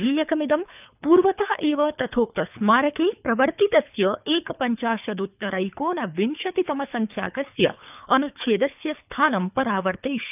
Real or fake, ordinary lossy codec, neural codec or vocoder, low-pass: fake; none; codec, 24 kHz, 3 kbps, HILCodec; 3.6 kHz